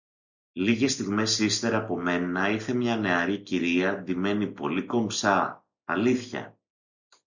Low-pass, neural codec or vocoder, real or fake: 7.2 kHz; none; real